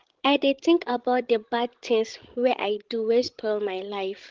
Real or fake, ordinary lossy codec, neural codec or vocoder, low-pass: fake; Opus, 16 kbps; codec, 16 kHz, 16 kbps, FreqCodec, larger model; 7.2 kHz